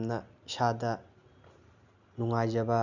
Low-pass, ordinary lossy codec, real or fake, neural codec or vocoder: 7.2 kHz; none; real; none